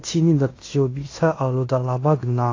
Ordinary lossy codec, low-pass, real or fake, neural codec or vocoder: AAC, 32 kbps; 7.2 kHz; fake; codec, 16 kHz in and 24 kHz out, 0.9 kbps, LongCat-Audio-Codec, fine tuned four codebook decoder